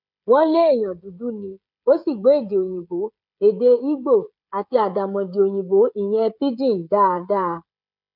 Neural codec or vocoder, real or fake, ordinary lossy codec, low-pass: codec, 16 kHz, 16 kbps, FreqCodec, smaller model; fake; none; 5.4 kHz